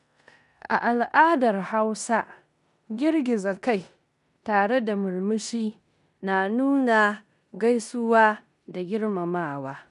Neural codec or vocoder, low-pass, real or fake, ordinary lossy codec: codec, 16 kHz in and 24 kHz out, 0.9 kbps, LongCat-Audio-Codec, four codebook decoder; 10.8 kHz; fake; none